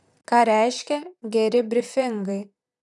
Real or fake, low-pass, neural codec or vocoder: fake; 10.8 kHz; vocoder, 44.1 kHz, 128 mel bands, Pupu-Vocoder